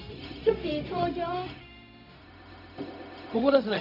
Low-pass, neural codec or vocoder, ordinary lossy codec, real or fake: 5.4 kHz; codec, 16 kHz, 0.4 kbps, LongCat-Audio-Codec; none; fake